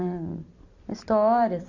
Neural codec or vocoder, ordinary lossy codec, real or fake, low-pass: vocoder, 44.1 kHz, 128 mel bands every 256 samples, BigVGAN v2; Opus, 64 kbps; fake; 7.2 kHz